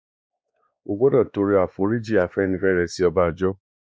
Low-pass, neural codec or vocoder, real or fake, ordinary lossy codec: none; codec, 16 kHz, 2 kbps, X-Codec, WavLM features, trained on Multilingual LibriSpeech; fake; none